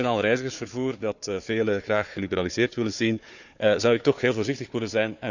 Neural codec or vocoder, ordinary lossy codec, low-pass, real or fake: codec, 16 kHz, 4 kbps, FunCodec, trained on Chinese and English, 50 frames a second; none; 7.2 kHz; fake